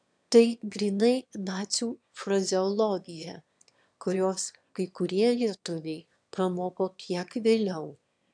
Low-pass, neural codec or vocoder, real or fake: 9.9 kHz; autoencoder, 22.05 kHz, a latent of 192 numbers a frame, VITS, trained on one speaker; fake